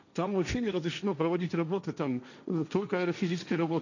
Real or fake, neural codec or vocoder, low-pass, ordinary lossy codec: fake; codec, 16 kHz, 1.1 kbps, Voila-Tokenizer; 7.2 kHz; none